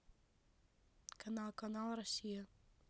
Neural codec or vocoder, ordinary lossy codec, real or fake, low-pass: none; none; real; none